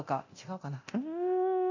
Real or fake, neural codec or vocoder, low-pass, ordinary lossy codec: fake; codec, 24 kHz, 0.9 kbps, DualCodec; 7.2 kHz; none